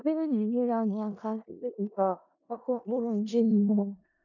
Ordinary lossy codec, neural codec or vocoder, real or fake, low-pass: none; codec, 16 kHz in and 24 kHz out, 0.4 kbps, LongCat-Audio-Codec, four codebook decoder; fake; 7.2 kHz